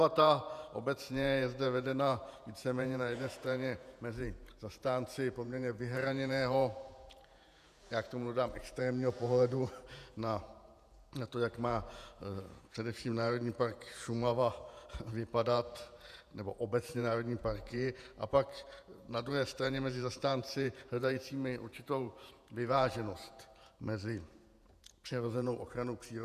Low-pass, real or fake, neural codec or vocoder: 14.4 kHz; fake; vocoder, 48 kHz, 128 mel bands, Vocos